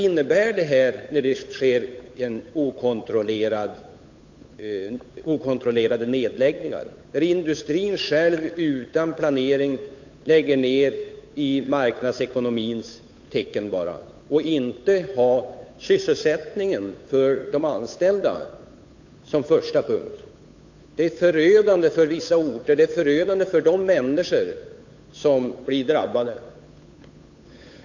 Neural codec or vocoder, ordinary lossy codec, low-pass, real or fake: codec, 16 kHz, 8 kbps, FunCodec, trained on Chinese and English, 25 frames a second; AAC, 48 kbps; 7.2 kHz; fake